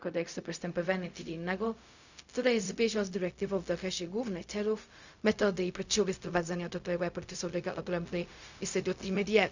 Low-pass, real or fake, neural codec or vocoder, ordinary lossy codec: 7.2 kHz; fake; codec, 16 kHz, 0.4 kbps, LongCat-Audio-Codec; none